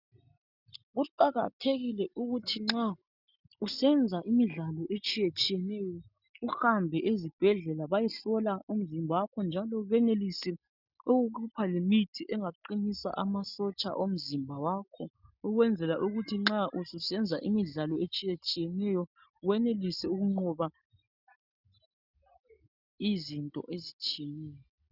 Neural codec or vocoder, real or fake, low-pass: none; real; 5.4 kHz